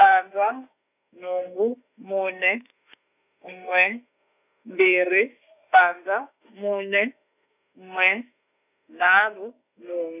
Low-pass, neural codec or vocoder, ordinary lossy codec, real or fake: 3.6 kHz; autoencoder, 48 kHz, 32 numbers a frame, DAC-VAE, trained on Japanese speech; none; fake